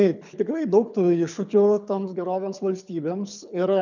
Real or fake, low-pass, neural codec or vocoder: fake; 7.2 kHz; codec, 24 kHz, 6 kbps, HILCodec